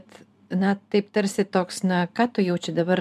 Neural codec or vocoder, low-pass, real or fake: vocoder, 44.1 kHz, 128 mel bands every 512 samples, BigVGAN v2; 14.4 kHz; fake